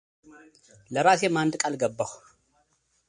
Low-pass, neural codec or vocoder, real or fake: 9.9 kHz; none; real